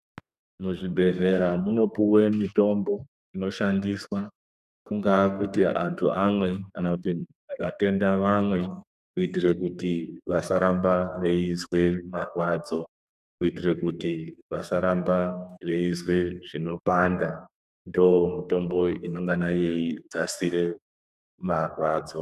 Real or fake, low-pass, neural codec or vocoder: fake; 14.4 kHz; codec, 32 kHz, 1.9 kbps, SNAC